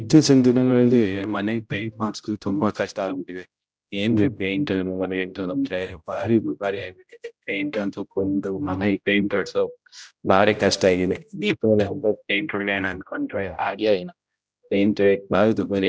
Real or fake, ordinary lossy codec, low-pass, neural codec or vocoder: fake; none; none; codec, 16 kHz, 0.5 kbps, X-Codec, HuBERT features, trained on general audio